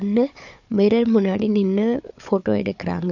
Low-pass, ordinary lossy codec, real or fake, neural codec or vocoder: 7.2 kHz; none; fake; codec, 16 kHz, 4 kbps, FunCodec, trained on Chinese and English, 50 frames a second